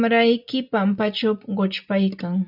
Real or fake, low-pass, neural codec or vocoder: real; 5.4 kHz; none